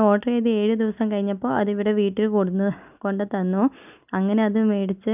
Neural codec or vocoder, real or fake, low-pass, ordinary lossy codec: none; real; 3.6 kHz; none